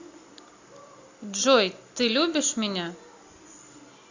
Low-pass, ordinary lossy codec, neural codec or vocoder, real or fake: 7.2 kHz; AAC, 48 kbps; none; real